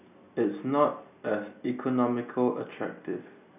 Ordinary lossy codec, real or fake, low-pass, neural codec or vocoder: none; real; 3.6 kHz; none